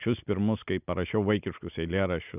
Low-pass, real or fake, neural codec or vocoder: 3.6 kHz; real; none